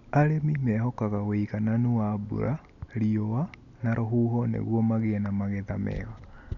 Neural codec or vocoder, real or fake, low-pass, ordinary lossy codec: none; real; 7.2 kHz; none